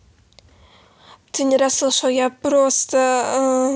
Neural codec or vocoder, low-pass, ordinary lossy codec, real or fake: none; none; none; real